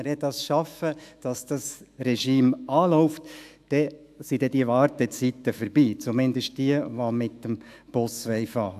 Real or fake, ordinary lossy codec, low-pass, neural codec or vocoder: fake; AAC, 96 kbps; 14.4 kHz; autoencoder, 48 kHz, 128 numbers a frame, DAC-VAE, trained on Japanese speech